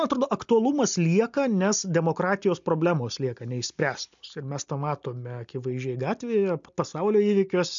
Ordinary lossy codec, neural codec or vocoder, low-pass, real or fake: MP3, 64 kbps; none; 7.2 kHz; real